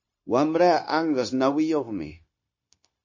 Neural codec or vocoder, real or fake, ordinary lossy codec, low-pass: codec, 16 kHz, 0.9 kbps, LongCat-Audio-Codec; fake; MP3, 32 kbps; 7.2 kHz